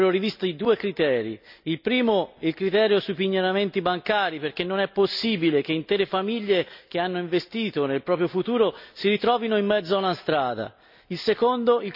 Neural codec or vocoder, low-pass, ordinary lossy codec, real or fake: none; 5.4 kHz; none; real